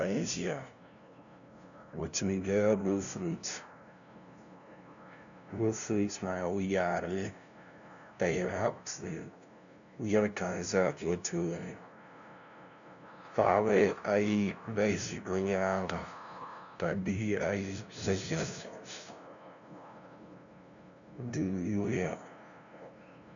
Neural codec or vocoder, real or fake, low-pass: codec, 16 kHz, 0.5 kbps, FunCodec, trained on LibriTTS, 25 frames a second; fake; 7.2 kHz